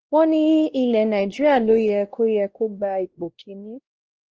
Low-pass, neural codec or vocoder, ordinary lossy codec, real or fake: 7.2 kHz; codec, 16 kHz, 1 kbps, X-Codec, WavLM features, trained on Multilingual LibriSpeech; Opus, 16 kbps; fake